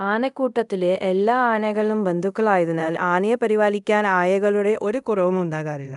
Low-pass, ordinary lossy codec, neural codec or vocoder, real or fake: 10.8 kHz; none; codec, 24 kHz, 0.5 kbps, DualCodec; fake